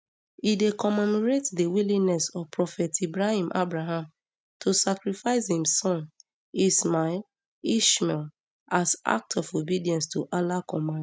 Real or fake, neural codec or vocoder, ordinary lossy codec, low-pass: real; none; none; none